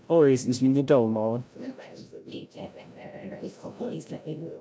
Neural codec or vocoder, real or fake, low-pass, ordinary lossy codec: codec, 16 kHz, 0.5 kbps, FreqCodec, larger model; fake; none; none